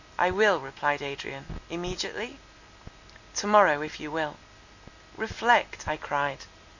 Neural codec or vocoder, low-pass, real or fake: none; 7.2 kHz; real